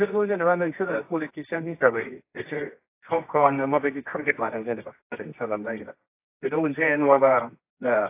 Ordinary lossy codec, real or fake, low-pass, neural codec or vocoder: AAC, 24 kbps; fake; 3.6 kHz; codec, 24 kHz, 0.9 kbps, WavTokenizer, medium music audio release